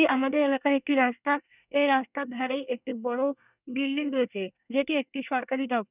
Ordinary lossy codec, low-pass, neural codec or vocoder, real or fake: none; 3.6 kHz; codec, 24 kHz, 1 kbps, SNAC; fake